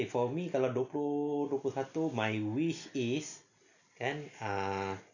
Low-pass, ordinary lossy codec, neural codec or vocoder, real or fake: 7.2 kHz; none; none; real